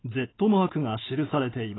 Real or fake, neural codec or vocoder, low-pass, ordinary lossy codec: real; none; 7.2 kHz; AAC, 16 kbps